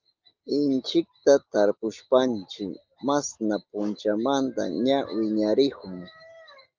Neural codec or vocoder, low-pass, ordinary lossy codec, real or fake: none; 7.2 kHz; Opus, 32 kbps; real